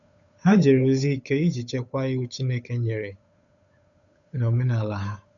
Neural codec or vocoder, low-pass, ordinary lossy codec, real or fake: codec, 16 kHz, 8 kbps, FunCodec, trained on Chinese and English, 25 frames a second; 7.2 kHz; none; fake